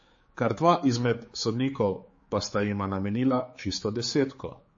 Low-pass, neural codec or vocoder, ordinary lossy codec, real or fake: 7.2 kHz; codec, 16 kHz, 4 kbps, X-Codec, HuBERT features, trained on general audio; MP3, 32 kbps; fake